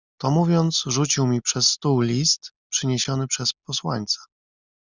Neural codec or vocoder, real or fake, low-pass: none; real; 7.2 kHz